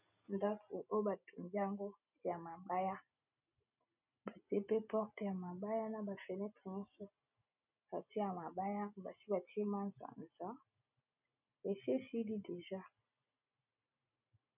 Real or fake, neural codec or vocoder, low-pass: real; none; 3.6 kHz